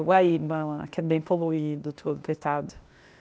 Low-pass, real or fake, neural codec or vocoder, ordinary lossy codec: none; fake; codec, 16 kHz, 0.8 kbps, ZipCodec; none